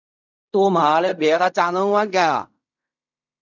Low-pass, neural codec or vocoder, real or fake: 7.2 kHz; codec, 16 kHz in and 24 kHz out, 0.4 kbps, LongCat-Audio-Codec, fine tuned four codebook decoder; fake